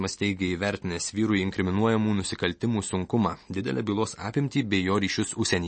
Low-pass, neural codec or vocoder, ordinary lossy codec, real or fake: 9.9 kHz; none; MP3, 32 kbps; real